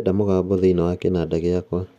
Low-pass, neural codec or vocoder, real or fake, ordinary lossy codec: 14.4 kHz; none; real; none